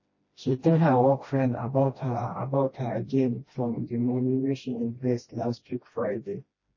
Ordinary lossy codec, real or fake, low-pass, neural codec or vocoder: MP3, 32 kbps; fake; 7.2 kHz; codec, 16 kHz, 1 kbps, FreqCodec, smaller model